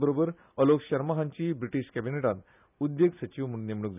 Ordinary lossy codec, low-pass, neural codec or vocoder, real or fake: none; 3.6 kHz; none; real